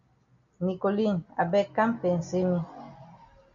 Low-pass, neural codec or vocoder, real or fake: 7.2 kHz; none; real